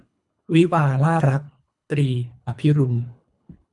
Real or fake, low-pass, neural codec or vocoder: fake; 10.8 kHz; codec, 24 kHz, 3 kbps, HILCodec